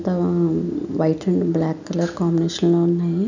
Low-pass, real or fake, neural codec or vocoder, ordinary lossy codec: 7.2 kHz; real; none; none